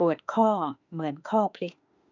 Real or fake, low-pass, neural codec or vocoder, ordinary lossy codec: fake; 7.2 kHz; autoencoder, 48 kHz, 32 numbers a frame, DAC-VAE, trained on Japanese speech; none